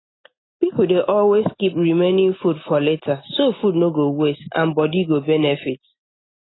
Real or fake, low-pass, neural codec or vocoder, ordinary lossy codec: real; 7.2 kHz; none; AAC, 16 kbps